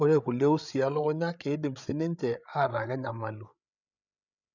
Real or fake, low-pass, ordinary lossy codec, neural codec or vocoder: fake; 7.2 kHz; none; codec, 16 kHz, 8 kbps, FreqCodec, larger model